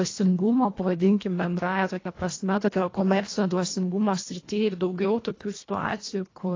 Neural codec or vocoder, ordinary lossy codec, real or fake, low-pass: codec, 24 kHz, 1.5 kbps, HILCodec; AAC, 32 kbps; fake; 7.2 kHz